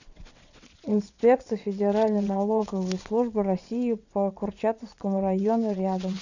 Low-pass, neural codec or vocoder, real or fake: 7.2 kHz; vocoder, 22.05 kHz, 80 mel bands, WaveNeXt; fake